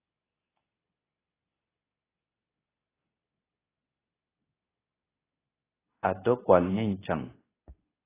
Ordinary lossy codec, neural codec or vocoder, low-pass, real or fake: AAC, 16 kbps; codec, 24 kHz, 0.9 kbps, WavTokenizer, medium speech release version 2; 3.6 kHz; fake